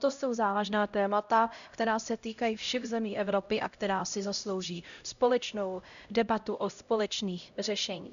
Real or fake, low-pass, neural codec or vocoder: fake; 7.2 kHz; codec, 16 kHz, 0.5 kbps, X-Codec, HuBERT features, trained on LibriSpeech